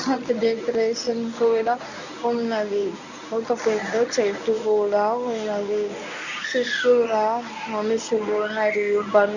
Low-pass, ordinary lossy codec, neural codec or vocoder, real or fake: 7.2 kHz; none; codec, 24 kHz, 0.9 kbps, WavTokenizer, medium speech release version 1; fake